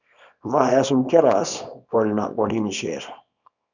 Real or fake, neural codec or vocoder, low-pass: fake; codec, 24 kHz, 0.9 kbps, WavTokenizer, small release; 7.2 kHz